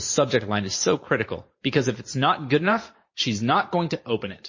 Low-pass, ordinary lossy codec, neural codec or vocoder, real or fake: 7.2 kHz; MP3, 32 kbps; vocoder, 44.1 kHz, 128 mel bands every 512 samples, BigVGAN v2; fake